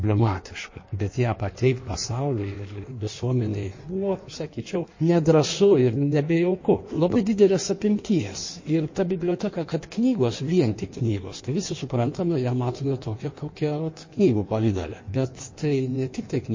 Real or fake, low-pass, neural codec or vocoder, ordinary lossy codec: fake; 7.2 kHz; codec, 16 kHz in and 24 kHz out, 1.1 kbps, FireRedTTS-2 codec; MP3, 32 kbps